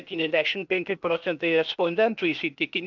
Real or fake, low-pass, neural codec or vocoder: fake; 7.2 kHz; codec, 16 kHz, 0.8 kbps, ZipCodec